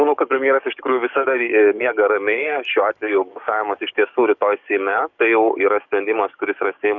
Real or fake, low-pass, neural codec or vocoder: fake; 7.2 kHz; codec, 44.1 kHz, 7.8 kbps, DAC